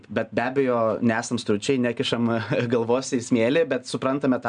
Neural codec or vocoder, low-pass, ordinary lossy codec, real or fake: none; 9.9 kHz; MP3, 96 kbps; real